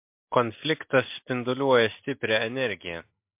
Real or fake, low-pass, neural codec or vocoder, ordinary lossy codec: real; 3.6 kHz; none; MP3, 32 kbps